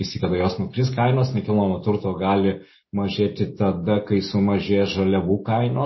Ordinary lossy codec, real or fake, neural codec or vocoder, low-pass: MP3, 24 kbps; real; none; 7.2 kHz